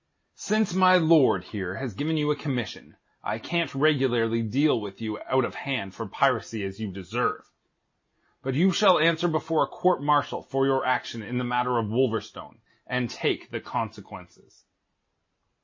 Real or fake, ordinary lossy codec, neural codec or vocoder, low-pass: real; MP3, 32 kbps; none; 7.2 kHz